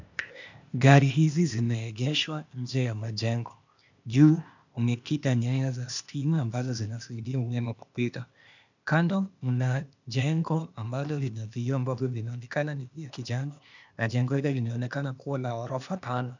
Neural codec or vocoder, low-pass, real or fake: codec, 16 kHz, 0.8 kbps, ZipCodec; 7.2 kHz; fake